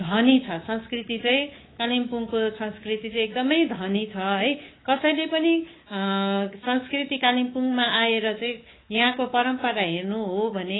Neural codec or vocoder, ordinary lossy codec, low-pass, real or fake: codec, 24 kHz, 3.1 kbps, DualCodec; AAC, 16 kbps; 7.2 kHz; fake